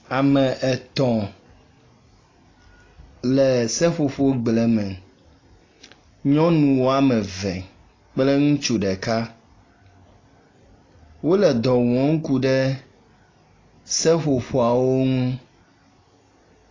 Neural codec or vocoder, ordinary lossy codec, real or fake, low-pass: none; AAC, 32 kbps; real; 7.2 kHz